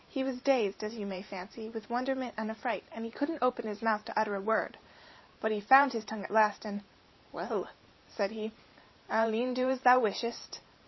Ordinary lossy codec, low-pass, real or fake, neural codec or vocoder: MP3, 24 kbps; 7.2 kHz; fake; vocoder, 44.1 kHz, 128 mel bands every 512 samples, BigVGAN v2